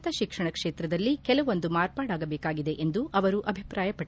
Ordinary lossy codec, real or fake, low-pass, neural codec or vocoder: none; real; none; none